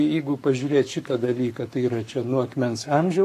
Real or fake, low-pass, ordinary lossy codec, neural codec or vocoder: fake; 14.4 kHz; AAC, 64 kbps; codec, 44.1 kHz, 7.8 kbps, Pupu-Codec